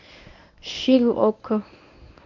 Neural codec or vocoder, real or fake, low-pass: codec, 24 kHz, 0.9 kbps, WavTokenizer, medium speech release version 1; fake; 7.2 kHz